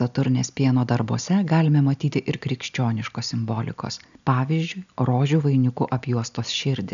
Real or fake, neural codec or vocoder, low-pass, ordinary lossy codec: real; none; 7.2 kHz; MP3, 96 kbps